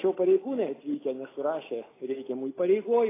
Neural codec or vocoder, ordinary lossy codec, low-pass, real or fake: none; AAC, 16 kbps; 3.6 kHz; real